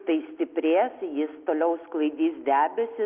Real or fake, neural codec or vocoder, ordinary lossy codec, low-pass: real; none; Opus, 24 kbps; 3.6 kHz